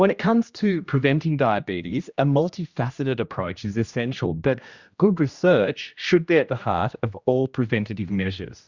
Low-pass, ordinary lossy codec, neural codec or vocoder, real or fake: 7.2 kHz; Opus, 64 kbps; codec, 16 kHz, 1 kbps, X-Codec, HuBERT features, trained on general audio; fake